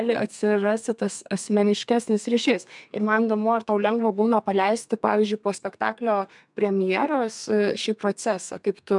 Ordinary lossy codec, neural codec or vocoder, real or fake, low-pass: MP3, 96 kbps; codec, 32 kHz, 1.9 kbps, SNAC; fake; 10.8 kHz